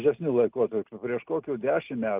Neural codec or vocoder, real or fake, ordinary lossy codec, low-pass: none; real; Opus, 24 kbps; 3.6 kHz